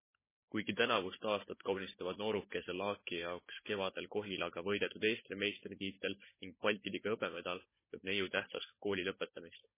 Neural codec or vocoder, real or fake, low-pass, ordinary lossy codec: codec, 16 kHz, 16 kbps, FunCodec, trained on Chinese and English, 50 frames a second; fake; 3.6 kHz; MP3, 16 kbps